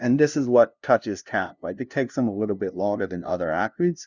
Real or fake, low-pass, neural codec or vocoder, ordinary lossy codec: fake; 7.2 kHz; codec, 16 kHz, 0.5 kbps, FunCodec, trained on LibriTTS, 25 frames a second; Opus, 64 kbps